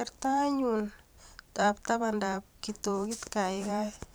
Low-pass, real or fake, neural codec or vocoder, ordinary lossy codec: none; fake; vocoder, 44.1 kHz, 128 mel bands every 512 samples, BigVGAN v2; none